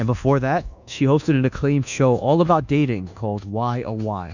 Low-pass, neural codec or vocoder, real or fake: 7.2 kHz; codec, 24 kHz, 1.2 kbps, DualCodec; fake